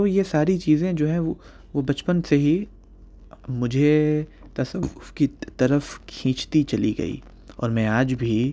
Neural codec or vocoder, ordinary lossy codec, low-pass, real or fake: none; none; none; real